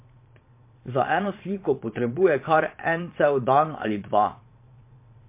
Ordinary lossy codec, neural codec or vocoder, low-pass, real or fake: MP3, 24 kbps; vocoder, 22.05 kHz, 80 mel bands, Vocos; 3.6 kHz; fake